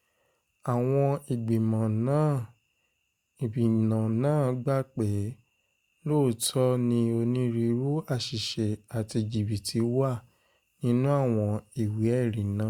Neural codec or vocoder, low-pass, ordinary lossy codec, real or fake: none; 19.8 kHz; Opus, 64 kbps; real